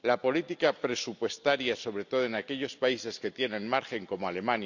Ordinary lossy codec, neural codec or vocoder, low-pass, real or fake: none; none; 7.2 kHz; real